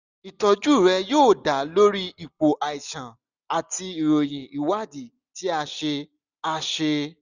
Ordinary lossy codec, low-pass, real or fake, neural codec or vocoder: none; 7.2 kHz; real; none